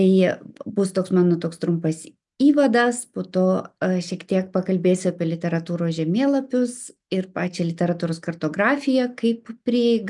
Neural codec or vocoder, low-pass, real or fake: none; 10.8 kHz; real